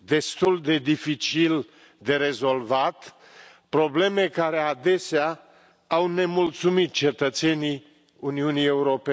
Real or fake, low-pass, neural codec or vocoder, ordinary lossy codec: real; none; none; none